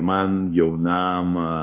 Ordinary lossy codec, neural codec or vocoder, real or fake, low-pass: AAC, 32 kbps; codec, 24 kHz, 1.2 kbps, DualCodec; fake; 3.6 kHz